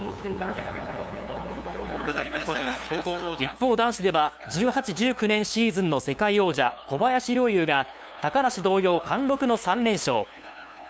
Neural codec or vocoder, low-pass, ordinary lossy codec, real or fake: codec, 16 kHz, 2 kbps, FunCodec, trained on LibriTTS, 25 frames a second; none; none; fake